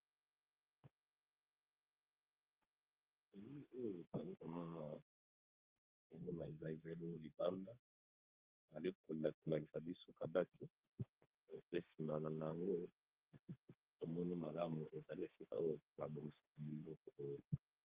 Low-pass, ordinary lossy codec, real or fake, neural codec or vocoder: 3.6 kHz; Opus, 32 kbps; fake; codec, 32 kHz, 1.9 kbps, SNAC